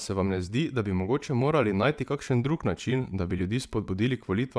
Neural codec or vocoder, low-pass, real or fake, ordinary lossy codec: vocoder, 22.05 kHz, 80 mel bands, WaveNeXt; none; fake; none